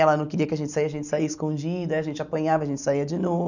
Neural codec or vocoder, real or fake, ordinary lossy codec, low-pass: none; real; none; 7.2 kHz